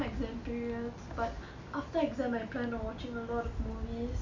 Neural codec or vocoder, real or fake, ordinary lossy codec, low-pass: none; real; none; 7.2 kHz